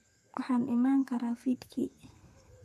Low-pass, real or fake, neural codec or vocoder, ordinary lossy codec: 14.4 kHz; fake; codec, 32 kHz, 1.9 kbps, SNAC; none